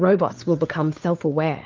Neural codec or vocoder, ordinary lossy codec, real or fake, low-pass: codec, 16 kHz, 4 kbps, FunCodec, trained on LibriTTS, 50 frames a second; Opus, 32 kbps; fake; 7.2 kHz